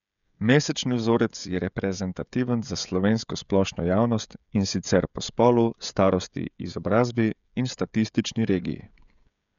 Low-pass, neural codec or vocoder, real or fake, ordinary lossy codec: 7.2 kHz; codec, 16 kHz, 16 kbps, FreqCodec, smaller model; fake; none